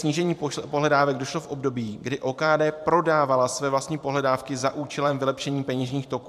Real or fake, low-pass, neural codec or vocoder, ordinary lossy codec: fake; 14.4 kHz; vocoder, 44.1 kHz, 128 mel bands every 512 samples, BigVGAN v2; AAC, 96 kbps